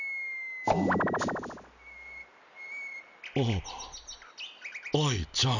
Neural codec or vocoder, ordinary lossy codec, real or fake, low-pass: none; none; real; 7.2 kHz